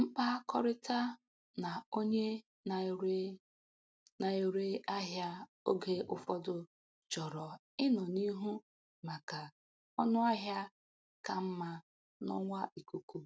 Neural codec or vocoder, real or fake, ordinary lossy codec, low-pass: none; real; none; none